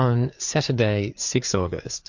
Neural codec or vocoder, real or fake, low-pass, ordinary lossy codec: codec, 16 kHz, 4 kbps, FreqCodec, larger model; fake; 7.2 kHz; MP3, 48 kbps